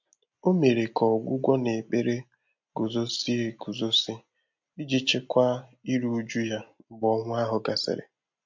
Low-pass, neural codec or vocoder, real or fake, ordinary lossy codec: 7.2 kHz; none; real; MP3, 48 kbps